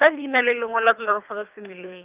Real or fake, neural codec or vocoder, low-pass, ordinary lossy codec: fake; codec, 24 kHz, 3 kbps, HILCodec; 3.6 kHz; Opus, 32 kbps